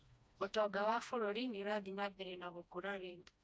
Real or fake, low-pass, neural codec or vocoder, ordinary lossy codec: fake; none; codec, 16 kHz, 1 kbps, FreqCodec, smaller model; none